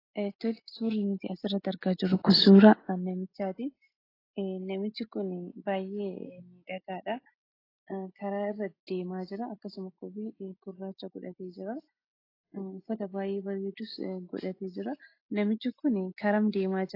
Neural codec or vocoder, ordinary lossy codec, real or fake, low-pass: none; AAC, 24 kbps; real; 5.4 kHz